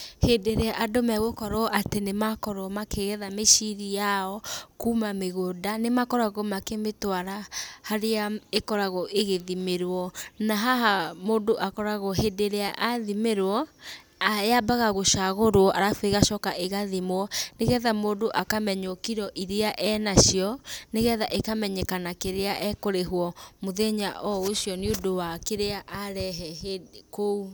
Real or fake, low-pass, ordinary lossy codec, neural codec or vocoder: real; none; none; none